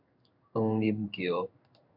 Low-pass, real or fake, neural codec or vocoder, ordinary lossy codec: 5.4 kHz; fake; codec, 16 kHz in and 24 kHz out, 1 kbps, XY-Tokenizer; MP3, 48 kbps